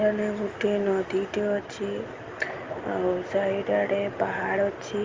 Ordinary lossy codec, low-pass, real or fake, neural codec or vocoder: Opus, 32 kbps; 7.2 kHz; real; none